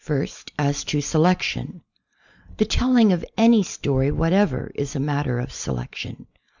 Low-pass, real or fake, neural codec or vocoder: 7.2 kHz; real; none